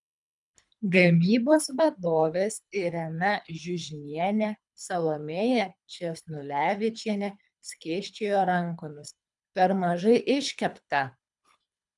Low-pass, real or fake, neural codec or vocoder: 10.8 kHz; fake; codec, 24 kHz, 3 kbps, HILCodec